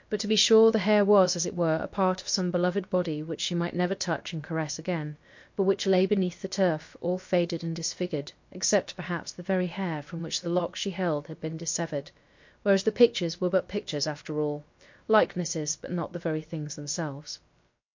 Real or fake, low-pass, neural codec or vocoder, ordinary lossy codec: fake; 7.2 kHz; codec, 16 kHz, about 1 kbps, DyCAST, with the encoder's durations; MP3, 48 kbps